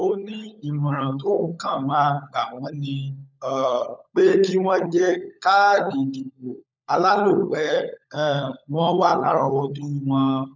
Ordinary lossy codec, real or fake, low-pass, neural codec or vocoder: none; fake; 7.2 kHz; codec, 16 kHz, 16 kbps, FunCodec, trained on LibriTTS, 50 frames a second